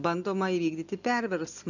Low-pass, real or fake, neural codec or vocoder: 7.2 kHz; real; none